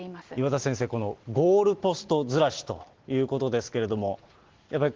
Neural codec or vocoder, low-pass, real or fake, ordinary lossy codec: none; 7.2 kHz; real; Opus, 16 kbps